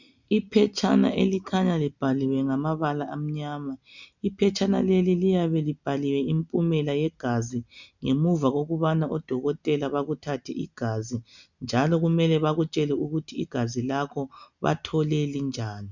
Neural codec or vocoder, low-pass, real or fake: none; 7.2 kHz; real